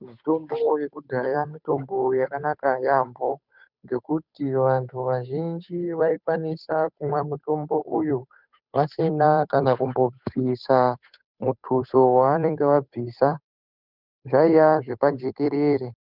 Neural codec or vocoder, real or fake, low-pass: codec, 16 kHz, 8 kbps, FunCodec, trained on Chinese and English, 25 frames a second; fake; 5.4 kHz